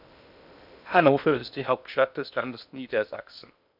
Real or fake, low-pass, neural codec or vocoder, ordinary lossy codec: fake; 5.4 kHz; codec, 16 kHz in and 24 kHz out, 0.6 kbps, FocalCodec, streaming, 2048 codes; none